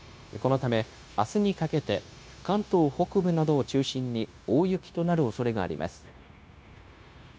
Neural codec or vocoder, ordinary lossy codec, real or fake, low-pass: codec, 16 kHz, 0.9 kbps, LongCat-Audio-Codec; none; fake; none